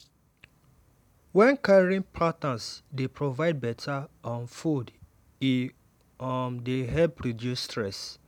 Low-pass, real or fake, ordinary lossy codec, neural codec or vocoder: 19.8 kHz; real; none; none